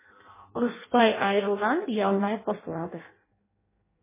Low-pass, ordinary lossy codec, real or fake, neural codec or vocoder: 3.6 kHz; MP3, 16 kbps; fake; codec, 16 kHz in and 24 kHz out, 0.6 kbps, FireRedTTS-2 codec